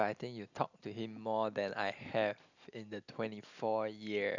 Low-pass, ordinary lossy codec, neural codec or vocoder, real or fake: 7.2 kHz; none; none; real